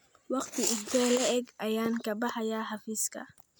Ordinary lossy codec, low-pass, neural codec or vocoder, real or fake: none; none; none; real